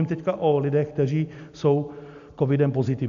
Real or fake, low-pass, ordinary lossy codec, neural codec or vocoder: real; 7.2 kHz; AAC, 96 kbps; none